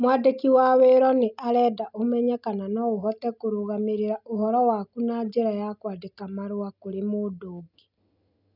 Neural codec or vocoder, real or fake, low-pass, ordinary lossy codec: none; real; 5.4 kHz; none